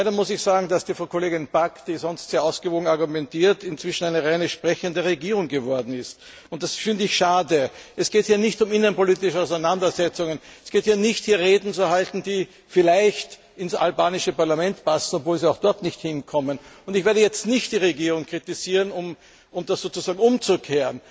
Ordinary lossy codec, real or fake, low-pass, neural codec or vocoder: none; real; none; none